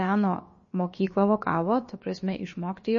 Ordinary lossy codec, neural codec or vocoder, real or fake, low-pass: MP3, 32 kbps; codec, 16 kHz, about 1 kbps, DyCAST, with the encoder's durations; fake; 7.2 kHz